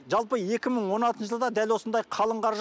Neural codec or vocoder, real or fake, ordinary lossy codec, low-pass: none; real; none; none